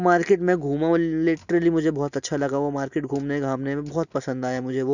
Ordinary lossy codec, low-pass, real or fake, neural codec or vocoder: none; 7.2 kHz; real; none